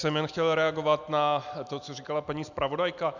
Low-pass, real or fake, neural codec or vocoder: 7.2 kHz; real; none